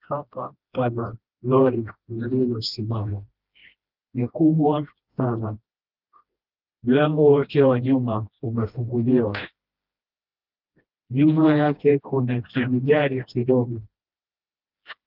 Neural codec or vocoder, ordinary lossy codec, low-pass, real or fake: codec, 16 kHz, 1 kbps, FreqCodec, smaller model; Opus, 24 kbps; 5.4 kHz; fake